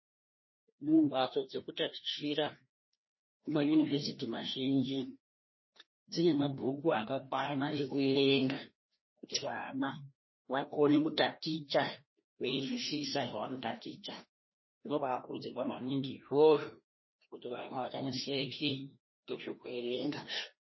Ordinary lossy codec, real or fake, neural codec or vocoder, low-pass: MP3, 24 kbps; fake; codec, 16 kHz, 1 kbps, FreqCodec, larger model; 7.2 kHz